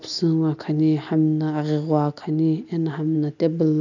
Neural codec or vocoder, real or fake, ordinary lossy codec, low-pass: none; real; none; 7.2 kHz